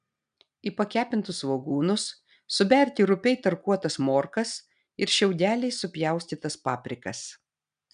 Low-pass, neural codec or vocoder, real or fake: 9.9 kHz; none; real